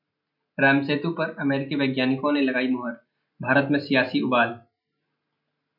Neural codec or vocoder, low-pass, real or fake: none; 5.4 kHz; real